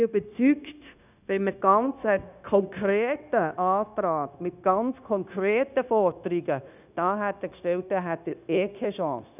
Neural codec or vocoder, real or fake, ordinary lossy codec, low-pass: codec, 16 kHz, 0.9 kbps, LongCat-Audio-Codec; fake; none; 3.6 kHz